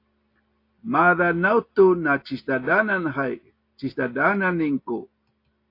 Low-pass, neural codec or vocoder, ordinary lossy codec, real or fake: 5.4 kHz; none; AAC, 32 kbps; real